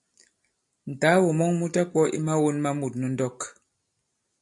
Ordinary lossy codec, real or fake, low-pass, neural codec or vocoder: MP3, 64 kbps; real; 10.8 kHz; none